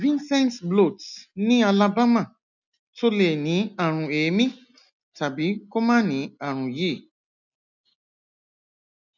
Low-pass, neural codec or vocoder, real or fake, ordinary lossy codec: 7.2 kHz; none; real; none